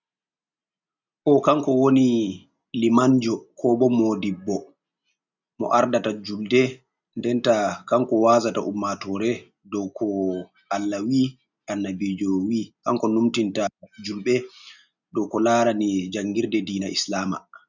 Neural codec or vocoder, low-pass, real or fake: none; 7.2 kHz; real